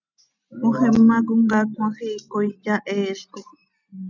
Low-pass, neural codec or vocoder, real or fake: 7.2 kHz; none; real